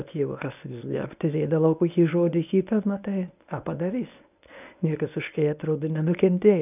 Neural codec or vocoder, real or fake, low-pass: codec, 24 kHz, 0.9 kbps, WavTokenizer, medium speech release version 1; fake; 3.6 kHz